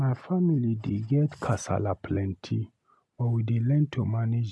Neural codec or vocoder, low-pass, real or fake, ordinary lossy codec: none; none; real; none